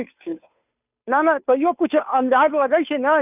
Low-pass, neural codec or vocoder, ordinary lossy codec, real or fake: 3.6 kHz; codec, 16 kHz, 2 kbps, FunCodec, trained on Chinese and English, 25 frames a second; none; fake